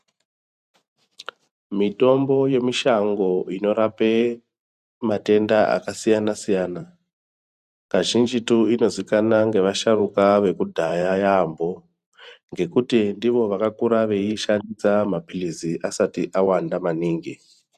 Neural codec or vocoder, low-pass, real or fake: vocoder, 44.1 kHz, 128 mel bands every 512 samples, BigVGAN v2; 14.4 kHz; fake